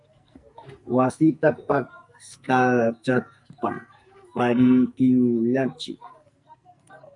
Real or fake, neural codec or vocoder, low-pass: fake; codec, 44.1 kHz, 2.6 kbps, SNAC; 10.8 kHz